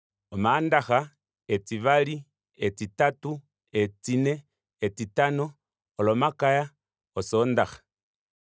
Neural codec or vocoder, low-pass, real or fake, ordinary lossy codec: none; none; real; none